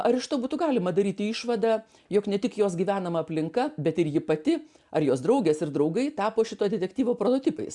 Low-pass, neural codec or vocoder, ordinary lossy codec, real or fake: 10.8 kHz; none; MP3, 96 kbps; real